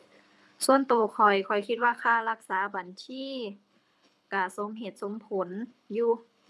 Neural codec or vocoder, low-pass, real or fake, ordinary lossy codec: codec, 24 kHz, 6 kbps, HILCodec; none; fake; none